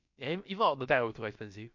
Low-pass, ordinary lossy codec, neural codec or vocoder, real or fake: 7.2 kHz; MP3, 48 kbps; codec, 16 kHz, about 1 kbps, DyCAST, with the encoder's durations; fake